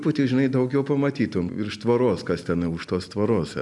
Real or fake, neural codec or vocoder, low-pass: real; none; 10.8 kHz